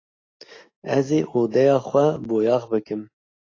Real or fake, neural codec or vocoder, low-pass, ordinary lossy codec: real; none; 7.2 kHz; AAC, 32 kbps